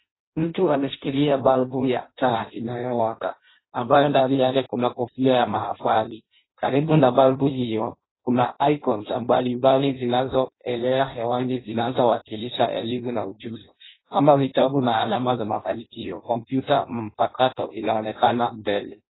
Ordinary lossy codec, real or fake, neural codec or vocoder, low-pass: AAC, 16 kbps; fake; codec, 16 kHz in and 24 kHz out, 0.6 kbps, FireRedTTS-2 codec; 7.2 kHz